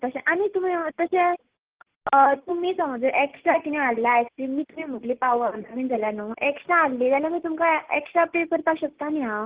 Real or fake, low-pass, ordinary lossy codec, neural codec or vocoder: real; 3.6 kHz; Opus, 16 kbps; none